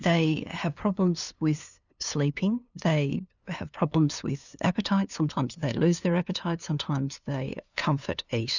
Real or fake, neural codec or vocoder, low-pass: fake; codec, 16 kHz, 2 kbps, FunCodec, trained on LibriTTS, 25 frames a second; 7.2 kHz